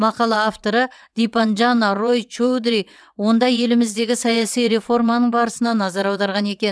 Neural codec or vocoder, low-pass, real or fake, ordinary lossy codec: vocoder, 22.05 kHz, 80 mel bands, WaveNeXt; none; fake; none